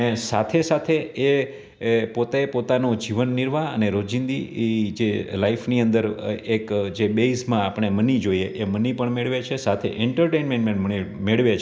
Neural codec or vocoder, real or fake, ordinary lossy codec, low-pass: none; real; none; none